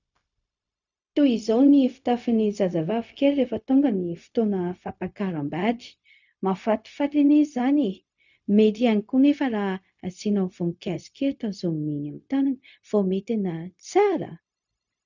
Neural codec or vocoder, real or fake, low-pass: codec, 16 kHz, 0.4 kbps, LongCat-Audio-Codec; fake; 7.2 kHz